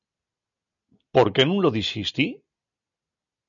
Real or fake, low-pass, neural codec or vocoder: real; 7.2 kHz; none